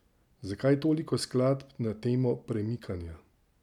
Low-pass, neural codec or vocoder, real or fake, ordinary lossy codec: 19.8 kHz; none; real; none